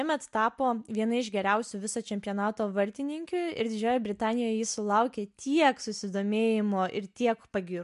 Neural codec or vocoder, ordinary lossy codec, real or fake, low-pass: none; MP3, 64 kbps; real; 10.8 kHz